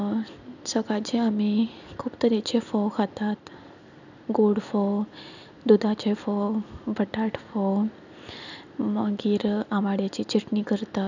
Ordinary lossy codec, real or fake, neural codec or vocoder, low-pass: none; real; none; 7.2 kHz